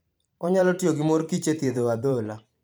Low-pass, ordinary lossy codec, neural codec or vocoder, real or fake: none; none; vocoder, 44.1 kHz, 128 mel bands every 512 samples, BigVGAN v2; fake